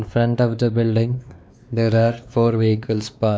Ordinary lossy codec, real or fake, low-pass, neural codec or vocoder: none; fake; none; codec, 16 kHz, 2 kbps, X-Codec, WavLM features, trained on Multilingual LibriSpeech